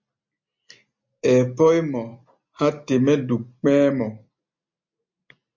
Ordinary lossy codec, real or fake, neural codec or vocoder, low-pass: MP3, 48 kbps; real; none; 7.2 kHz